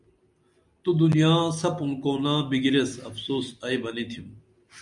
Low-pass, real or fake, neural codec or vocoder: 10.8 kHz; real; none